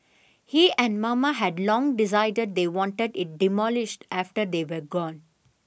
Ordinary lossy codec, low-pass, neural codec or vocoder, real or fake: none; none; none; real